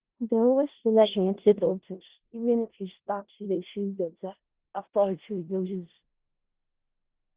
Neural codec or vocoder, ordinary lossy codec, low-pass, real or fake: codec, 16 kHz in and 24 kHz out, 0.4 kbps, LongCat-Audio-Codec, four codebook decoder; Opus, 16 kbps; 3.6 kHz; fake